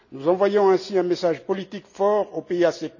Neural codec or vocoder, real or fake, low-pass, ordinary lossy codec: none; real; 7.2 kHz; none